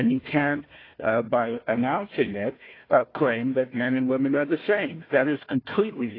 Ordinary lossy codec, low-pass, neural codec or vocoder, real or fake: AAC, 24 kbps; 5.4 kHz; codec, 16 kHz, 1 kbps, FunCodec, trained on Chinese and English, 50 frames a second; fake